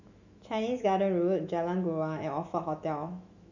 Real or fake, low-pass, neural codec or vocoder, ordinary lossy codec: fake; 7.2 kHz; autoencoder, 48 kHz, 128 numbers a frame, DAC-VAE, trained on Japanese speech; none